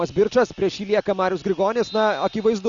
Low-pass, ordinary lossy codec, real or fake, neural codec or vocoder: 7.2 kHz; Opus, 64 kbps; real; none